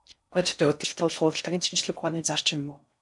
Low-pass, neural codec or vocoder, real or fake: 10.8 kHz; codec, 16 kHz in and 24 kHz out, 0.6 kbps, FocalCodec, streaming, 4096 codes; fake